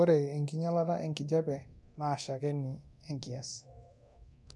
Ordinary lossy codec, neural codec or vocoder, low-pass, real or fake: none; codec, 24 kHz, 0.9 kbps, DualCodec; none; fake